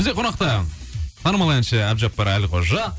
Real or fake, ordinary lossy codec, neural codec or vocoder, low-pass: real; none; none; none